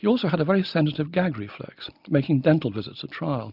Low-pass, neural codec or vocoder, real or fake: 5.4 kHz; none; real